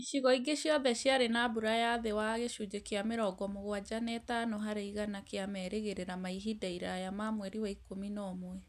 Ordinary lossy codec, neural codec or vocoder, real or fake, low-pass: none; none; real; 14.4 kHz